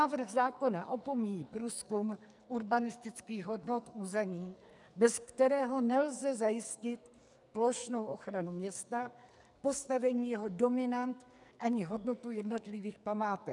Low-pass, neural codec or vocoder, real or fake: 10.8 kHz; codec, 44.1 kHz, 2.6 kbps, SNAC; fake